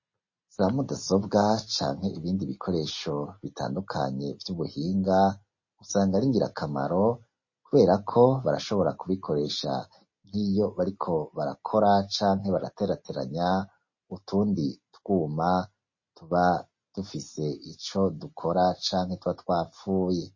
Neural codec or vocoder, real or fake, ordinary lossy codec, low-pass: none; real; MP3, 32 kbps; 7.2 kHz